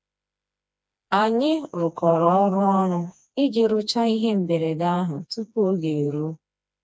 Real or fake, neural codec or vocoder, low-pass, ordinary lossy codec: fake; codec, 16 kHz, 2 kbps, FreqCodec, smaller model; none; none